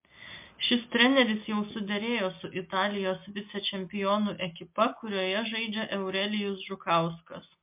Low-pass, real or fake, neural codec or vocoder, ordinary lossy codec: 3.6 kHz; real; none; MP3, 32 kbps